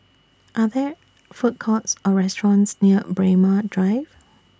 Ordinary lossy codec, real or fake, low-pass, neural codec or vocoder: none; real; none; none